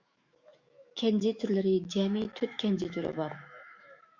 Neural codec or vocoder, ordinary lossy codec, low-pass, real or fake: autoencoder, 48 kHz, 128 numbers a frame, DAC-VAE, trained on Japanese speech; Opus, 64 kbps; 7.2 kHz; fake